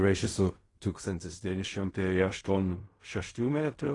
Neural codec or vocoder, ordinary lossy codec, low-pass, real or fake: codec, 16 kHz in and 24 kHz out, 0.4 kbps, LongCat-Audio-Codec, fine tuned four codebook decoder; AAC, 32 kbps; 10.8 kHz; fake